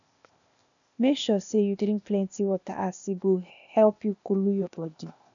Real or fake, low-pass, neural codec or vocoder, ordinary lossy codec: fake; 7.2 kHz; codec, 16 kHz, 0.8 kbps, ZipCodec; none